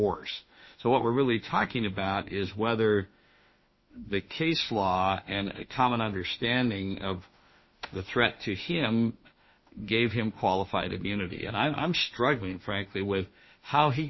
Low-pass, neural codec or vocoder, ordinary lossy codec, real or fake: 7.2 kHz; autoencoder, 48 kHz, 32 numbers a frame, DAC-VAE, trained on Japanese speech; MP3, 24 kbps; fake